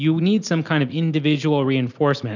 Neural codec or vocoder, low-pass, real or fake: none; 7.2 kHz; real